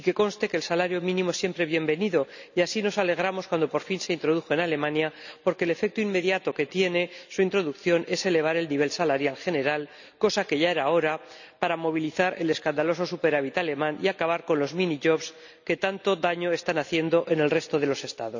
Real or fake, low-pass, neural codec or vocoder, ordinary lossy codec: real; 7.2 kHz; none; none